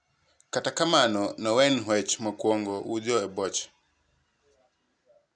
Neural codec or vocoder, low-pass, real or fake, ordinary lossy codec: none; 9.9 kHz; real; none